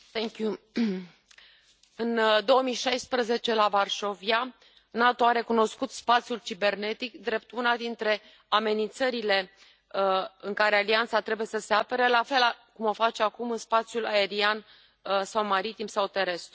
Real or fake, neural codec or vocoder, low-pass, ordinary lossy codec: real; none; none; none